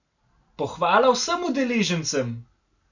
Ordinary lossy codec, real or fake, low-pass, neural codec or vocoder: none; real; 7.2 kHz; none